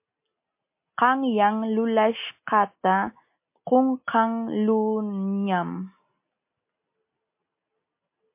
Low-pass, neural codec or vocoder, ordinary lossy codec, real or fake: 3.6 kHz; none; MP3, 24 kbps; real